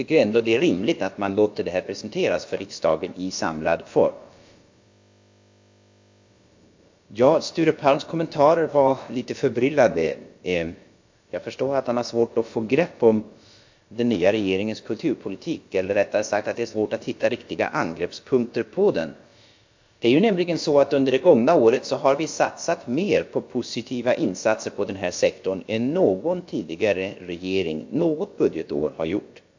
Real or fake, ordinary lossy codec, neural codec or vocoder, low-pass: fake; MP3, 48 kbps; codec, 16 kHz, about 1 kbps, DyCAST, with the encoder's durations; 7.2 kHz